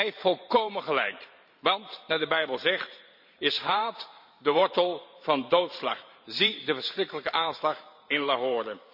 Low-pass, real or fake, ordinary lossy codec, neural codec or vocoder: 5.4 kHz; fake; none; vocoder, 44.1 kHz, 128 mel bands every 512 samples, BigVGAN v2